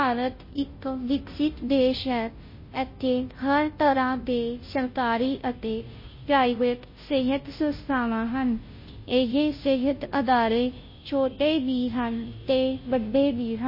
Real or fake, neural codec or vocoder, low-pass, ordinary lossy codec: fake; codec, 16 kHz, 0.5 kbps, FunCodec, trained on Chinese and English, 25 frames a second; 5.4 kHz; MP3, 24 kbps